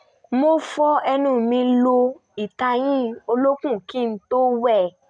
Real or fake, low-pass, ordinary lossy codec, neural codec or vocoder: real; 9.9 kHz; none; none